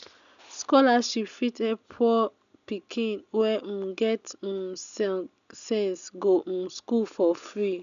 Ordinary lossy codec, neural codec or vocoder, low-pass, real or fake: none; none; 7.2 kHz; real